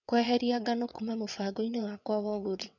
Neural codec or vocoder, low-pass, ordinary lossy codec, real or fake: vocoder, 22.05 kHz, 80 mel bands, WaveNeXt; 7.2 kHz; none; fake